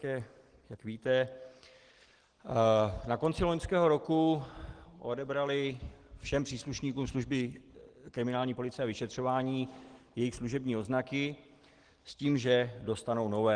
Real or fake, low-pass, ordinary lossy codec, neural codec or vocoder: real; 9.9 kHz; Opus, 16 kbps; none